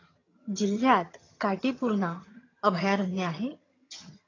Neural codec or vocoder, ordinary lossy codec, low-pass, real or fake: vocoder, 22.05 kHz, 80 mel bands, HiFi-GAN; AAC, 32 kbps; 7.2 kHz; fake